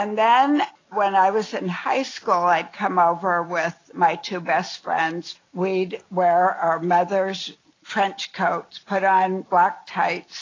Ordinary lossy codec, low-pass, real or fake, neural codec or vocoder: AAC, 32 kbps; 7.2 kHz; real; none